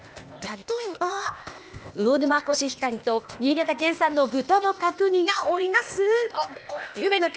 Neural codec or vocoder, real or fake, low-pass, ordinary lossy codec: codec, 16 kHz, 0.8 kbps, ZipCodec; fake; none; none